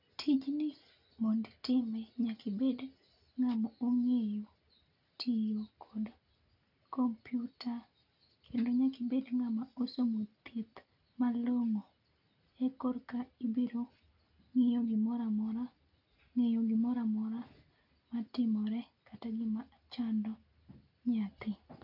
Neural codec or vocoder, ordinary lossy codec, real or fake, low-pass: none; none; real; 5.4 kHz